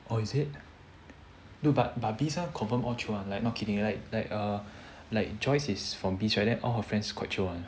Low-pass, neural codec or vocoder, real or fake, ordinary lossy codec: none; none; real; none